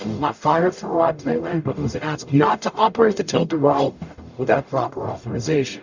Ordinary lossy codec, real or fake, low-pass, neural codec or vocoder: Opus, 64 kbps; fake; 7.2 kHz; codec, 44.1 kHz, 0.9 kbps, DAC